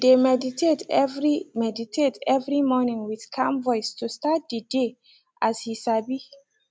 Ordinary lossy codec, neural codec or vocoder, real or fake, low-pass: none; none; real; none